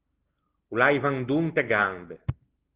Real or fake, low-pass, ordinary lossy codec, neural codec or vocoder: real; 3.6 kHz; Opus, 16 kbps; none